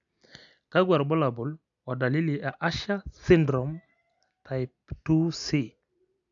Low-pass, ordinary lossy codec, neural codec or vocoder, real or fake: 7.2 kHz; none; none; real